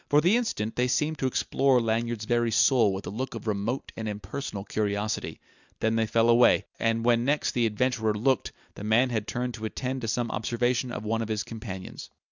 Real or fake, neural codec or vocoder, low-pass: real; none; 7.2 kHz